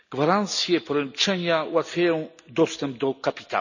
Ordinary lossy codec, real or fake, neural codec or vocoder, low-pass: none; real; none; 7.2 kHz